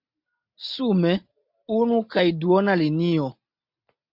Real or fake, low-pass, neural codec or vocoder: real; 5.4 kHz; none